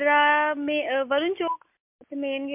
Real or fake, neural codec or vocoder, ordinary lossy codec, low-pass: real; none; MP3, 32 kbps; 3.6 kHz